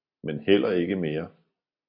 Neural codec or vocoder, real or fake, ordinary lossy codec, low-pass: none; real; AAC, 48 kbps; 5.4 kHz